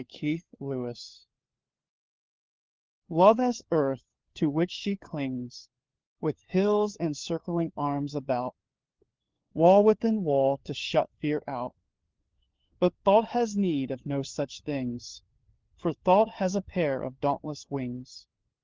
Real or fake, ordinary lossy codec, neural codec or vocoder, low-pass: fake; Opus, 16 kbps; codec, 16 kHz, 4 kbps, FunCodec, trained on LibriTTS, 50 frames a second; 7.2 kHz